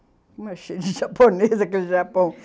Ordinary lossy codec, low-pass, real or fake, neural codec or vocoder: none; none; real; none